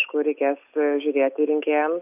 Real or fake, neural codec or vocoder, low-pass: real; none; 3.6 kHz